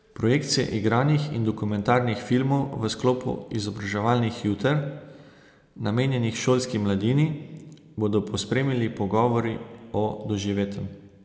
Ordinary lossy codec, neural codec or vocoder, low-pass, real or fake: none; none; none; real